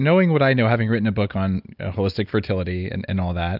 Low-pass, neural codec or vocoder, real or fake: 5.4 kHz; none; real